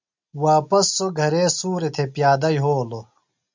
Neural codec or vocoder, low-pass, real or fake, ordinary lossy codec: none; 7.2 kHz; real; MP3, 64 kbps